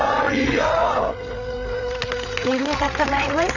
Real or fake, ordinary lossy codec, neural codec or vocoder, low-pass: fake; none; codec, 16 kHz, 8 kbps, FreqCodec, larger model; 7.2 kHz